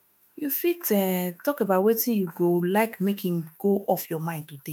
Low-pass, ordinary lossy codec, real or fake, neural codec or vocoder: none; none; fake; autoencoder, 48 kHz, 32 numbers a frame, DAC-VAE, trained on Japanese speech